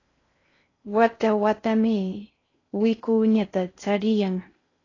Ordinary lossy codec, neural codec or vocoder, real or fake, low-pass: AAC, 32 kbps; codec, 16 kHz in and 24 kHz out, 0.6 kbps, FocalCodec, streaming, 4096 codes; fake; 7.2 kHz